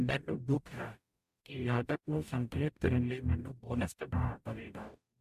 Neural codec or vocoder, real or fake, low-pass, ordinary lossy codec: codec, 44.1 kHz, 0.9 kbps, DAC; fake; 14.4 kHz; MP3, 64 kbps